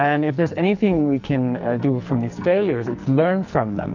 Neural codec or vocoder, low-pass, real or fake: codec, 44.1 kHz, 2.6 kbps, SNAC; 7.2 kHz; fake